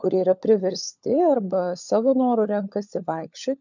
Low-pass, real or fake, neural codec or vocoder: 7.2 kHz; fake; codec, 16 kHz, 16 kbps, FunCodec, trained on LibriTTS, 50 frames a second